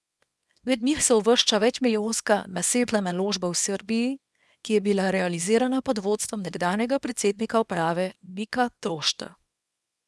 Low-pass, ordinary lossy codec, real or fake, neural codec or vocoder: none; none; fake; codec, 24 kHz, 0.9 kbps, WavTokenizer, small release